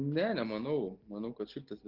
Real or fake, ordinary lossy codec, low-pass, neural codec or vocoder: real; Opus, 16 kbps; 5.4 kHz; none